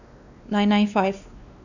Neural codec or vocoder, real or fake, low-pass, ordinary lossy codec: codec, 16 kHz, 2 kbps, X-Codec, WavLM features, trained on Multilingual LibriSpeech; fake; 7.2 kHz; none